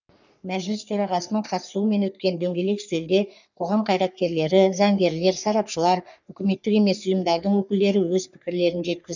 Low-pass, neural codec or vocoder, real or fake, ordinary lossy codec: 7.2 kHz; codec, 44.1 kHz, 3.4 kbps, Pupu-Codec; fake; none